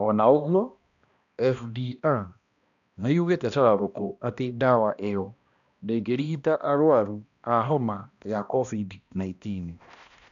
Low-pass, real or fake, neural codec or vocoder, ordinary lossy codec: 7.2 kHz; fake; codec, 16 kHz, 1 kbps, X-Codec, HuBERT features, trained on balanced general audio; none